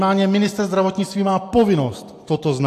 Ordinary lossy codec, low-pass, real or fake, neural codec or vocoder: AAC, 64 kbps; 14.4 kHz; fake; vocoder, 44.1 kHz, 128 mel bands every 256 samples, BigVGAN v2